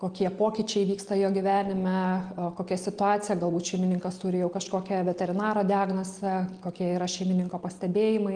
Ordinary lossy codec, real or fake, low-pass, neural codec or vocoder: Opus, 24 kbps; real; 9.9 kHz; none